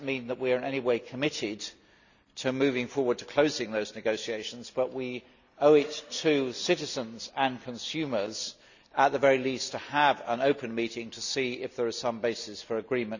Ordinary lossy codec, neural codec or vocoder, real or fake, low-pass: none; none; real; 7.2 kHz